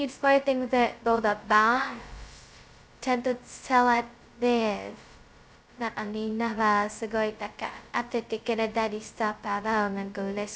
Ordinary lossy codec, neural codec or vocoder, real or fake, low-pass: none; codec, 16 kHz, 0.2 kbps, FocalCodec; fake; none